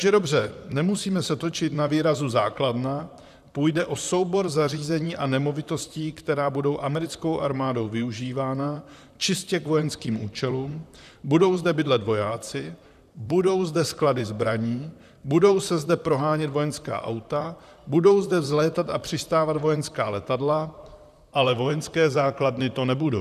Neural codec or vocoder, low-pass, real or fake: vocoder, 44.1 kHz, 128 mel bands, Pupu-Vocoder; 14.4 kHz; fake